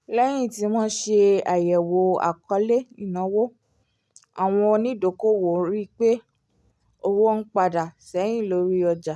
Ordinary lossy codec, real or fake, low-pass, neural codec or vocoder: none; real; none; none